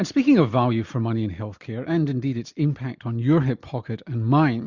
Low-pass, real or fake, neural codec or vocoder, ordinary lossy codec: 7.2 kHz; real; none; Opus, 64 kbps